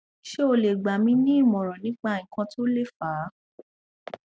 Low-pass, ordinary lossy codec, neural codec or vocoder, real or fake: none; none; none; real